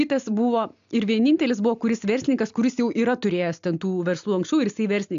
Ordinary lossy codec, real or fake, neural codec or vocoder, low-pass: AAC, 64 kbps; real; none; 7.2 kHz